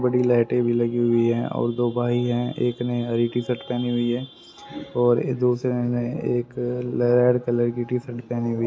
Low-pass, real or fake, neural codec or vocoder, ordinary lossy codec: none; real; none; none